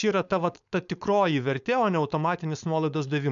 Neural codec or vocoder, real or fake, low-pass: codec, 16 kHz, 4.8 kbps, FACodec; fake; 7.2 kHz